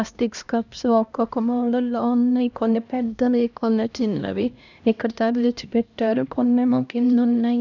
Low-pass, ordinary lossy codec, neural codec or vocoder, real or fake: 7.2 kHz; none; codec, 16 kHz, 1 kbps, X-Codec, HuBERT features, trained on LibriSpeech; fake